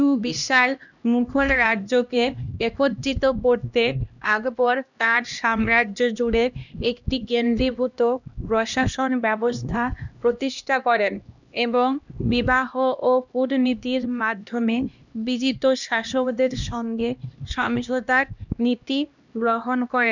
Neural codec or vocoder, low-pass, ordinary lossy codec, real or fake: codec, 16 kHz, 1 kbps, X-Codec, HuBERT features, trained on LibriSpeech; 7.2 kHz; none; fake